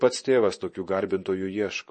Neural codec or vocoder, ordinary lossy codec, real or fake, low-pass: vocoder, 24 kHz, 100 mel bands, Vocos; MP3, 32 kbps; fake; 10.8 kHz